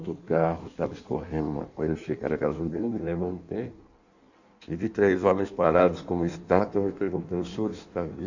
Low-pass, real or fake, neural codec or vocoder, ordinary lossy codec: 7.2 kHz; fake; codec, 16 kHz in and 24 kHz out, 1.1 kbps, FireRedTTS-2 codec; none